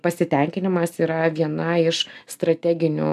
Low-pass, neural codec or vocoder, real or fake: 14.4 kHz; none; real